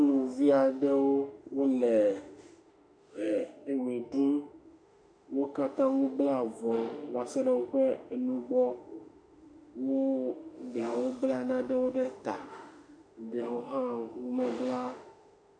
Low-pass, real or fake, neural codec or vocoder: 9.9 kHz; fake; autoencoder, 48 kHz, 32 numbers a frame, DAC-VAE, trained on Japanese speech